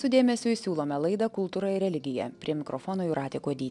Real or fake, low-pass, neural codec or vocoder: real; 10.8 kHz; none